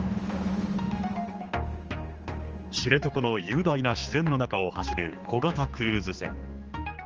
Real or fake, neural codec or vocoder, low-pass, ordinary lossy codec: fake; codec, 16 kHz, 2 kbps, X-Codec, HuBERT features, trained on general audio; 7.2 kHz; Opus, 24 kbps